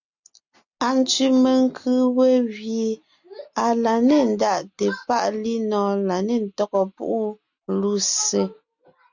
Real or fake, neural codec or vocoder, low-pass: real; none; 7.2 kHz